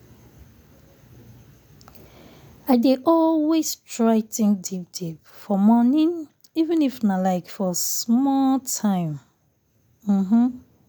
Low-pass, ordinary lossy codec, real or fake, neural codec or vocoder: none; none; real; none